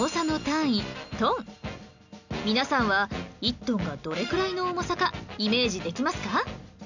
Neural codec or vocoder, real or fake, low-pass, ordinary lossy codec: none; real; 7.2 kHz; none